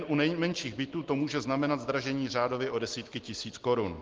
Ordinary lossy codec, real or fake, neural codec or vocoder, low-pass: Opus, 16 kbps; real; none; 7.2 kHz